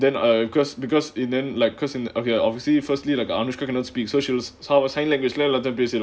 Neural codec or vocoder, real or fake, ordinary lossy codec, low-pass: none; real; none; none